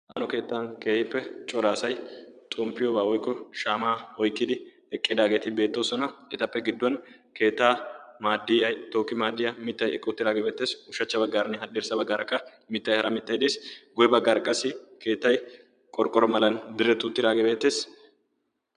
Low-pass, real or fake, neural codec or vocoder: 9.9 kHz; fake; vocoder, 22.05 kHz, 80 mel bands, Vocos